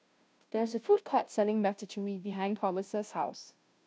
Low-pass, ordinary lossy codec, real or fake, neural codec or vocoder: none; none; fake; codec, 16 kHz, 0.5 kbps, FunCodec, trained on Chinese and English, 25 frames a second